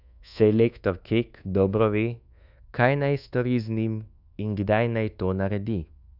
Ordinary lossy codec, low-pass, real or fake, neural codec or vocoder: none; 5.4 kHz; fake; codec, 24 kHz, 1.2 kbps, DualCodec